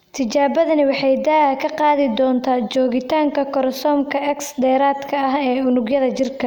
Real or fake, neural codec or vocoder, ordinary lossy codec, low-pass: real; none; none; 19.8 kHz